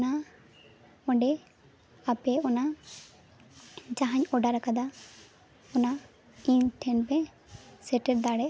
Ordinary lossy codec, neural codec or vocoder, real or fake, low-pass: none; none; real; none